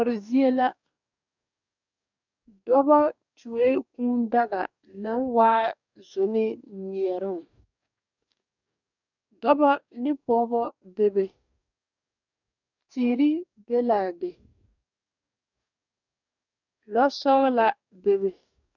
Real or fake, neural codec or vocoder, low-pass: fake; codec, 44.1 kHz, 2.6 kbps, DAC; 7.2 kHz